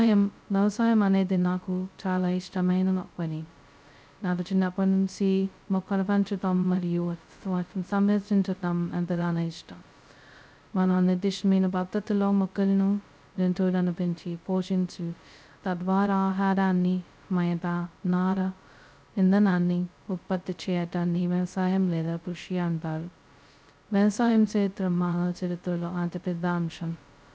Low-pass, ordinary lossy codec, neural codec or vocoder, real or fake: none; none; codec, 16 kHz, 0.2 kbps, FocalCodec; fake